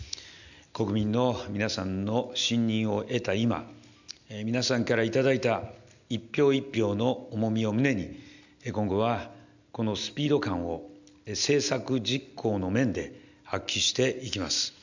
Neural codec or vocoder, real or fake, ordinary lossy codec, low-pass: none; real; none; 7.2 kHz